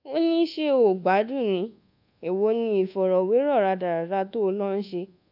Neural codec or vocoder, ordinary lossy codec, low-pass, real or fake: codec, 24 kHz, 1.2 kbps, DualCodec; none; 5.4 kHz; fake